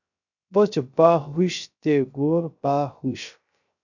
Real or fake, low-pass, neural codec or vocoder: fake; 7.2 kHz; codec, 16 kHz, 0.3 kbps, FocalCodec